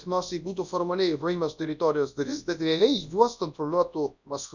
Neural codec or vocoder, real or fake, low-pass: codec, 24 kHz, 0.9 kbps, WavTokenizer, large speech release; fake; 7.2 kHz